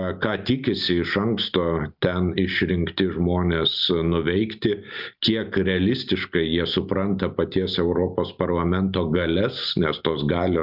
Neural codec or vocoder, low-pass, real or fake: none; 5.4 kHz; real